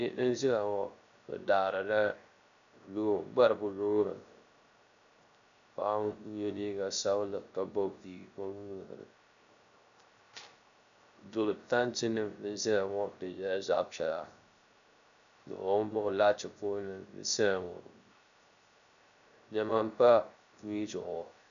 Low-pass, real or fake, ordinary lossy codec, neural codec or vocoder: 7.2 kHz; fake; MP3, 96 kbps; codec, 16 kHz, 0.3 kbps, FocalCodec